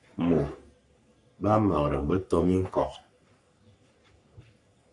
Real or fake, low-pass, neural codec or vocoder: fake; 10.8 kHz; codec, 44.1 kHz, 3.4 kbps, Pupu-Codec